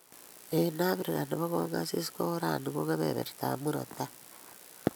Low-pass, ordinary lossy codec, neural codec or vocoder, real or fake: none; none; none; real